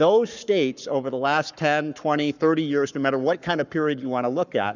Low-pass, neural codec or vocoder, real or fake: 7.2 kHz; codec, 44.1 kHz, 7.8 kbps, Pupu-Codec; fake